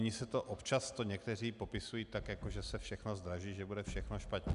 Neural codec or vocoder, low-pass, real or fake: none; 10.8 kHz; real